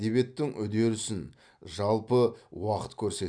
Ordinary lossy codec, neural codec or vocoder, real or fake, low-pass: none; none; real; 9.9 kHz